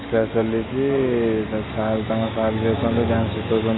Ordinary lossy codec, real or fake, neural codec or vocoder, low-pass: AAC, 16 kbps; real; none; 7.2 kHz